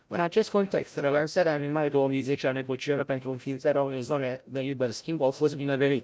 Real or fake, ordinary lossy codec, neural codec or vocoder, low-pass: fake; none; codec, 16 kHz, 0.5 kbps, FreqCodec, larger model; none